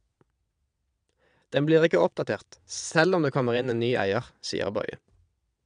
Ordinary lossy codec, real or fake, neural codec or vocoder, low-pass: none; fake; vocoder, 22.05 kHz, 80 mel bands, Vocos; 9.9 kHz